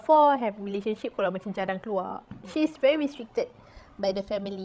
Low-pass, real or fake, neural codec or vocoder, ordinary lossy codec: none; fake; codec, 16 kHz, 16 kbps, FreqCodec, larger model; none